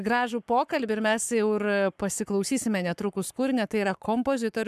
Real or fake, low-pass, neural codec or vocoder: fake; 14.4 kHz; codec, 44.1 kHz, 7.8 kbps, Pupu-Codec